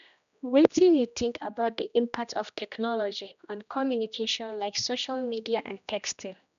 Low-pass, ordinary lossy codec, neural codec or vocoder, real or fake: 7.2 kHz; none; codec, 16 kHz, 1 kbps, X-Codec, HuBERT features, trained on general audio; fake